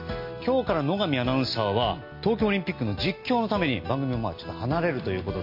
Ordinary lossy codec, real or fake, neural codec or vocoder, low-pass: MP3, 32 kbps; real; none; 5.4 kHz